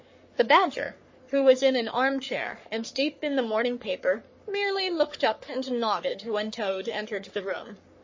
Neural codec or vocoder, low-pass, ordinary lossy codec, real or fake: codec, 44.1 kHz, 3.4 kbps, Pupu-Codec; 7.2 kHz; MP3, 32 kbps; fake